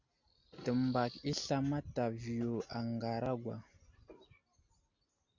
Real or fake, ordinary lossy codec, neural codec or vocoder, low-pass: real; MP3, 64 kbps; none; 7.2 kHz